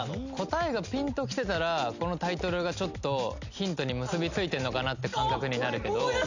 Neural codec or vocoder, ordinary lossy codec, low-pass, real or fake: none; none; 7.2 kHz; real